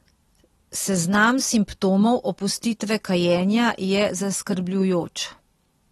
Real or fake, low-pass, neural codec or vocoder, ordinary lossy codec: real; 19.8 kHz; none; AAC, 32 kbps